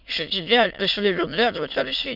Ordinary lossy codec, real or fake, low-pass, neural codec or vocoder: none; fake; 5.4 kHz; autoencoder, 22.05 kHz, a latent of 192 numbers a frame, VITS, trained on many speakers